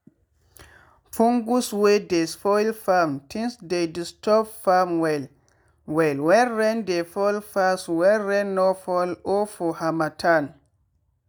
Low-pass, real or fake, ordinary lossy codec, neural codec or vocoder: none; real; none; none